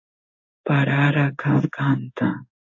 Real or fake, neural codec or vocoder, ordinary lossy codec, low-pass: fake; codec, 16 kHz in and 24 kHz out, 1 kbps, XY-Tokenizer; AAC, 48 kbps; 7.2 kHz